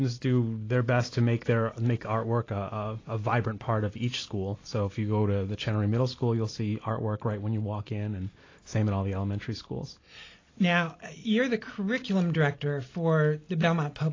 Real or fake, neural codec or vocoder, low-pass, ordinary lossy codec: real; none; 7.2 kHz; AAC, 32 kbps